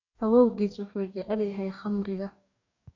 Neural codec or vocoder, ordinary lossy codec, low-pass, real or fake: codec, 44.1 kHz, 2.6 kbps, DAC; none; 7.2 kHz; fake